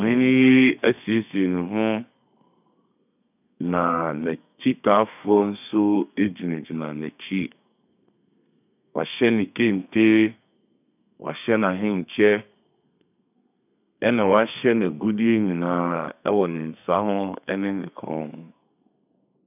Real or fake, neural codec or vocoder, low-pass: fake; codec, 32 kHz, 1.9 kbps, SNAC; 3.6 kHz